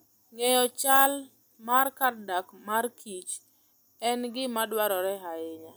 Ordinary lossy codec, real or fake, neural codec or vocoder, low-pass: none; real; none; none